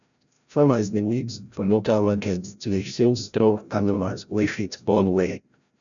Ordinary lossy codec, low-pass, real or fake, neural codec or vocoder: none; 7.2 kHz; fake; codec, 16 kHz, 0.5 kbps, FreqCodec, larger model